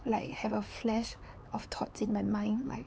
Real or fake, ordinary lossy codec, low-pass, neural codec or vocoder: fake; none; none; codec, 16 kHz, 4 kbps, X-Codec, HuBERT features, trained on LibriSpeech